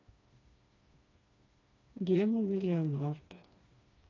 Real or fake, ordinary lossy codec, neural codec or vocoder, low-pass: fake; AAC, 32 kbps; codec, 16 kHz, 2 kbps, FreqCodec, smaller model; 7.2 kHz